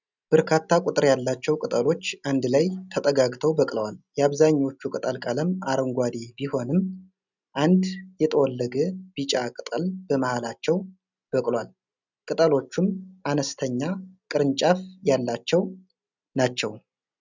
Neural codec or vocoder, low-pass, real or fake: none; 7.2 kHz; real